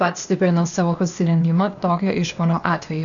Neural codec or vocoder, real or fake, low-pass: codec, 16 kHz, 0.8 kbps, ZipCodec; fake; 7.2 kHz